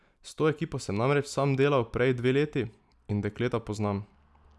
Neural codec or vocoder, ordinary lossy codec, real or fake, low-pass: none; none; real; none